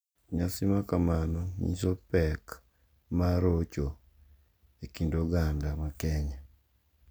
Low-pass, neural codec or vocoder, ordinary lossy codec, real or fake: none; none; none; real